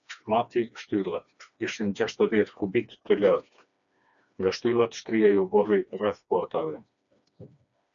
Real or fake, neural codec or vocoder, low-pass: fake; codec, 16 kHz, 2 kbps, FreqCodec, smaller model; 7.2 kHz